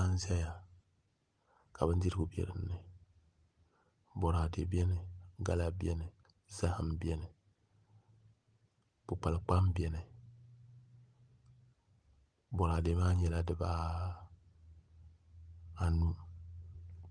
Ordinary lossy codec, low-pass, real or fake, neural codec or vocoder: Opus, 24 kbps; 9.9 kHz; real; none